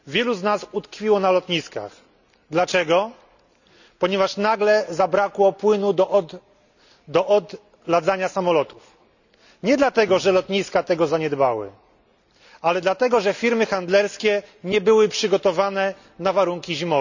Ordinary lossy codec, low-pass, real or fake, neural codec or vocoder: none; 7.2 kHz; real; none